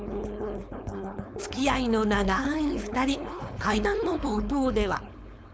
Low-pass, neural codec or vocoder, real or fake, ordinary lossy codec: none; codec, 16 kHz, 4.8 kbps, FACodec; fake; none